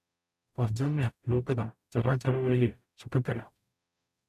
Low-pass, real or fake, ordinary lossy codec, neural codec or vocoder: 14.4 kHz; fake; none; codec, 44.1 kHz, 0.9 kbps, DAC